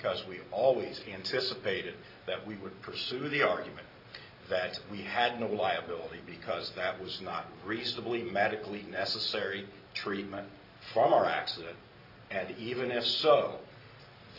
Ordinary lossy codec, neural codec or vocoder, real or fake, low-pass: AAC, 48 kbps; none; real; 5.4 kHz